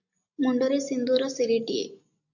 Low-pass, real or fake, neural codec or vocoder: 7.2 kHz; real; none